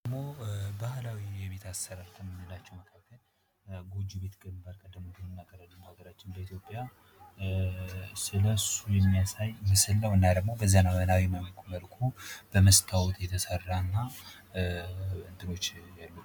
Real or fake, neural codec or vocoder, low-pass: real; none; 19.8 kHz